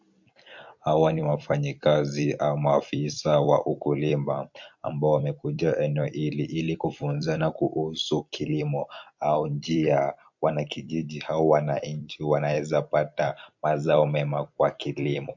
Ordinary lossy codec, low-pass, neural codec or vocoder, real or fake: MP3, 48 kbps; 7.2 kHz; vocoder, 44.1 kHz, 128 mel bands every 256 samples, BigVGAN v2; fake